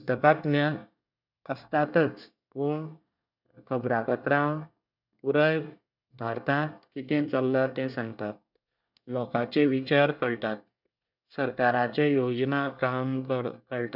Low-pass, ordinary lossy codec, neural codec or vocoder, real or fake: 5.4 kHz; none; codec, 24 kHz, 1 kbps, SNAC; fake